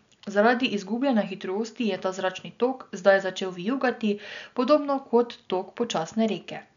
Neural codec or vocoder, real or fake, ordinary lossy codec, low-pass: none; real; none; 7.2 kHz